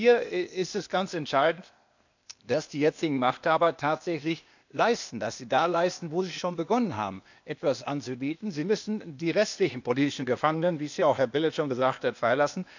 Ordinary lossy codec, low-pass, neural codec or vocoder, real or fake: none; 7.2 kHz; codec, 16 kHz, 0.8 kbps, ZipCodec; fake